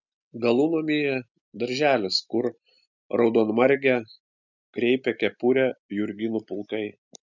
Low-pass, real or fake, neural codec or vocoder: 7.2 kHz; real; none